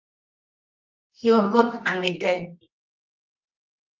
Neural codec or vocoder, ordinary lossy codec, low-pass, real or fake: codec, 24 kHz, 0.9 kbps, WavTokenizer, medium music audio release; Opus, 24 kbps; 7.2 kHz; fake